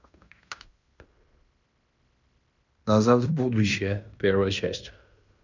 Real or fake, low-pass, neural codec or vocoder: fake; 7.2 kHz; codec, 16 kHz in and 24 kHz out, 0.9 kbps, LongCat-Audio-Codec, fine tuned four codebook decoder